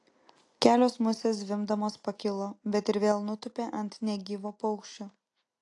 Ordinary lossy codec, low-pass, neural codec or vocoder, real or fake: AAC, 48 kbps; 10.8 kHz; none; real